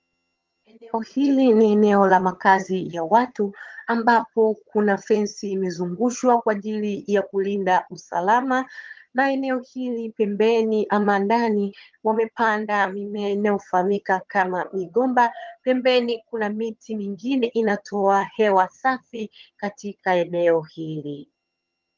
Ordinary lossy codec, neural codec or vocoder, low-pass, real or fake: Opus, 32 kbps; vocoder, 22.05 kHz, 80 mel bands, HiFi-GAN; 7.2 kHz; fake